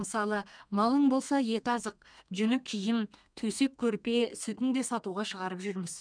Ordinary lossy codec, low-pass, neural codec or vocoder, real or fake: none; 9.9 kHz; codec, 32 kHz, 1.9 kbps, SNAC; fake